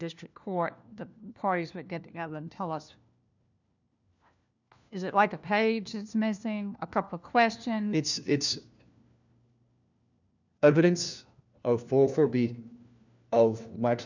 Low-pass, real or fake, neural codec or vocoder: 7.2 kHz; fake; codec, 16 kHz, 1 kbps, FunCodec, trained on LibriTTS, 50 frames a second